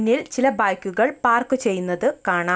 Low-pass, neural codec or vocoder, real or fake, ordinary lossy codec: none; none; real; none